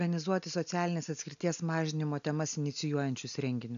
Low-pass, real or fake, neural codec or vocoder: 7.2 kHz; real; none